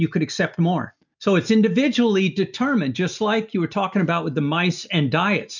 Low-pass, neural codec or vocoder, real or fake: 7.2 kHz; none; real